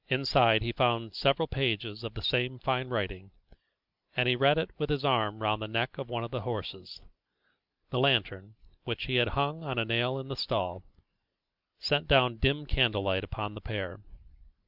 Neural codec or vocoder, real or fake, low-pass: none; real; 5.4 kHz